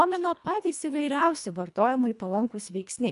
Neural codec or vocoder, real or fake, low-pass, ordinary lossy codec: codec, 24 kHz, 1.5 kbps, HILCodec; fake; 10.8 kHz; AAC, 96 kbps